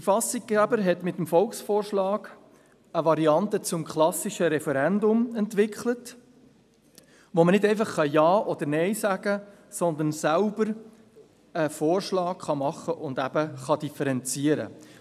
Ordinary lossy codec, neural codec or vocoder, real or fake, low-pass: none; none; real; 14.4 kHz